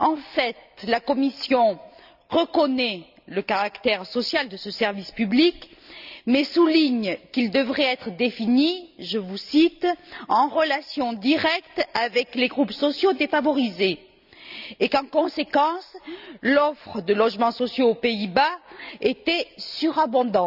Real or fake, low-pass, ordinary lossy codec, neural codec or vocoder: real; 5.4 kHz; none; none